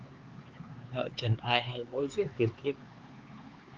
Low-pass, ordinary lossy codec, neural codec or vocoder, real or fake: 7.2 kHz; Opus, 32 kbps; codec, 16 kHz, 4 kbps, X-Codec, HuBERT features, trained on LibriSpeech; fake